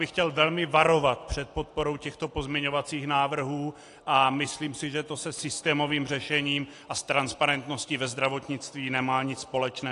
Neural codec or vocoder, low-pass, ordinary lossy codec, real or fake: none; 10.8 kHz; AAC, 48 kbps; real